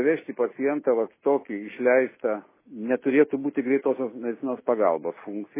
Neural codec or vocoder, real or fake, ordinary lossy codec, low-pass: none; real; MP3, 16 kbps; 3.6 kHz